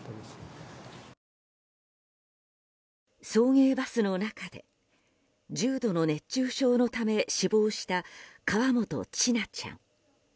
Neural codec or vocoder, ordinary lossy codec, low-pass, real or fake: none; none; none; real